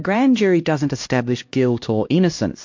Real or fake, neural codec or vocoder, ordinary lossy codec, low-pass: fake; codec, 16 kHz, 1 kbps, X-Codec, HuBERT features, trained on LibriSpeech; MP3, 48 kbps; 7.2 kHz